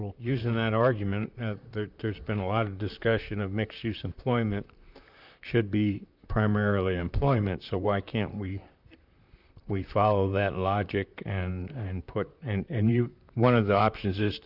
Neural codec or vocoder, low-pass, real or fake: vocoder, 44.1 kHz, 128 mel bands, Pupu-Vocoder; 5.4 kHz; fake